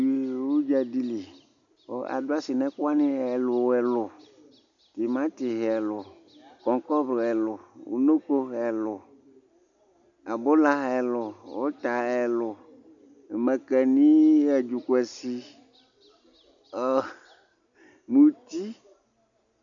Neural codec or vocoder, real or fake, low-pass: none; real; 7.2 kHz